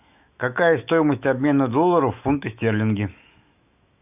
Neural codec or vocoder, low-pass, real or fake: autoencoder, 48 kHz, 128 numbers a frame, DAC-VAE, trained on Japanese speech; 3.6 kHz; fake